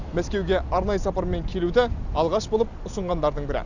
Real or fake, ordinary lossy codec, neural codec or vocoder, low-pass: real; none; none; 7.2 kHz